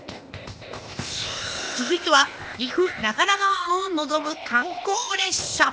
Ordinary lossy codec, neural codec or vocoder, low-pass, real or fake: none; codec, 16 kHz, 0.8 kbps, ZipCodec; none; fake